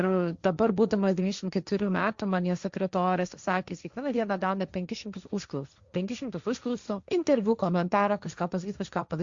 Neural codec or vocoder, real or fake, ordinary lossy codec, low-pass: codec, 16 kHz, 1.1 kbps, Voila-Tokenizer; fake; Opus, 64 kbps; 7.2 kHz